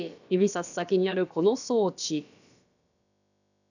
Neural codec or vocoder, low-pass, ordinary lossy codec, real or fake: codec, 16 kHz, about 1 kbps, DyCAST, with the encoder's durations; 7.2 kHz; none; fake